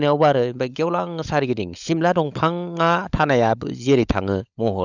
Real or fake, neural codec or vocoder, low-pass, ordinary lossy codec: fake; codec, 16 kHz, 16 kbps, FunCodec, trained on LibriTTS, 50 frames a second; 7.2 kHz; none